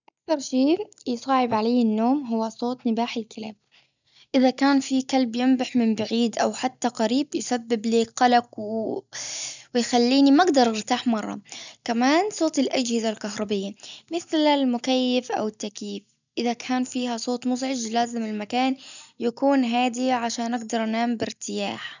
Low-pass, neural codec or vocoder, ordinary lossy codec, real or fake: 7.2 kHz; none; none; real